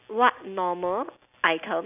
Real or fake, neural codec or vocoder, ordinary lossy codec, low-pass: real; none; none; 3.6 kHz